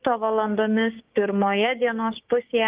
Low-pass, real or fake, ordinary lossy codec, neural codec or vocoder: 3.6 kHz; fake; Opus, 32 kbps; codec, 16 kHz, 6 kbps, DAC